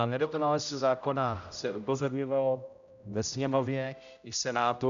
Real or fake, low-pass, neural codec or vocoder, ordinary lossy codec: fake; 7.2 kHz; codec, 16 kHz, 0.5 kbps, X-Codec, HuBERT features, trained on general audio; MP3, 96 kbps